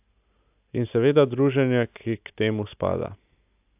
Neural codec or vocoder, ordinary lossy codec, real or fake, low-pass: none; AAC, 32 kbps; real; 3.6 kHz